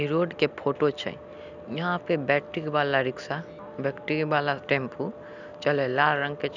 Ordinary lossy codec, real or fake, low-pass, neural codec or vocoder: none; real; 7.2 kHz; none